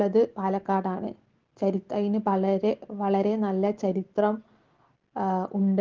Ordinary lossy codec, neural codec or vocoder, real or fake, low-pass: Opus, 16 kbps; none; real; 7.2 kHz